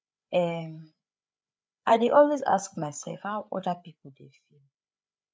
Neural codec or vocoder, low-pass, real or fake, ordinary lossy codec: codec, 16 kHz, 8 kbps, FreqCodec, larger model; none; fake; none